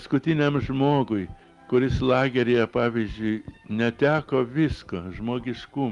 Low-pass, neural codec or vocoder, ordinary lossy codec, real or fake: 10.8 kHz; none; Opus, 32 kbps; real